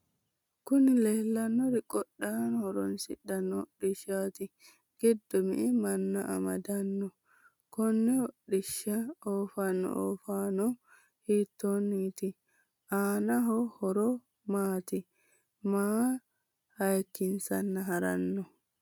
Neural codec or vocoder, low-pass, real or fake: none; 19.8 kHz; real